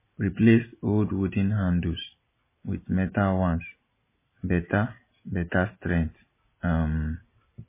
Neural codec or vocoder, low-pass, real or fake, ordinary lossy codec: none; 3.6 kHz; real; MP3, 16 kbps